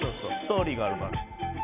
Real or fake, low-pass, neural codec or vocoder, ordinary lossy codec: real; 3.6 kHz; none; none